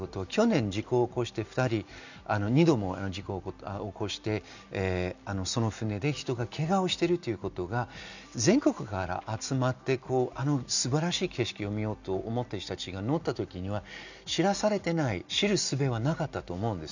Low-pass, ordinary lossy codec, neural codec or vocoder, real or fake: 7.2 kHz; none; none; real